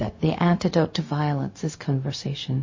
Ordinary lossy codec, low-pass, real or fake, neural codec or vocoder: MP3, 32 kbps; 7.2 kHz; fake; codec, 16 kHz, 0.4 kbps, LongCat-Audio-Codec